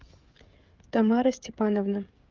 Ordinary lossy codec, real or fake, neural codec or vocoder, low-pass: Opus, 24 kbps; fake; codec, 16 kHz, 16 kbps, FreqCodec, smaller model; 7.2 kHz